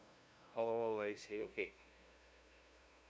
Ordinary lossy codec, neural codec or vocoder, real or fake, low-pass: none; codec, 16 kHz, 0.5 kbps, FunCodec, trained on LibriTTS, 25 frames a second; fake; none